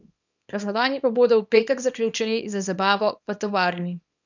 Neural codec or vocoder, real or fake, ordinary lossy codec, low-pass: codec, 24 kHz, 0.9 kbps, WavTokenizer, small release; fake; none; 7.2 kHz